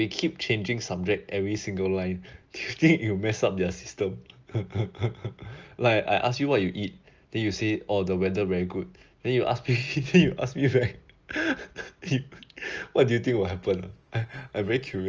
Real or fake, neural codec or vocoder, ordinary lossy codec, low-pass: real; none; Opus, 24 kbps; 7.2 kHz